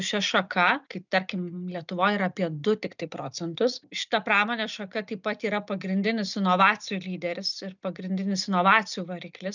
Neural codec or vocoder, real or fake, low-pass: none; real; 7.2 kHz